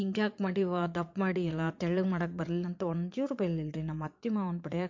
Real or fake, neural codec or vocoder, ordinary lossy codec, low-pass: fake; vocoder, 22.05 kHz, 80 mel bands, Vocos; MP3, 48 kbps; 7.2 kHz